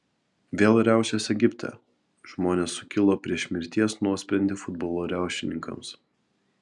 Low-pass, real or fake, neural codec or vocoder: 10.8 kHz; real; none